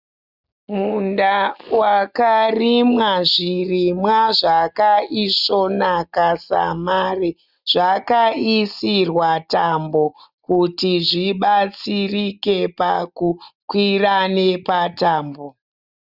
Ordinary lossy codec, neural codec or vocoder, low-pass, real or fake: Opus, 64 kbps; none; 5.4 kHz; real